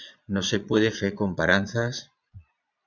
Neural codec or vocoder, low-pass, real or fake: vocoder, 22.05 kHz, 80 mel bands, Vocos; 7.2 kHz; fake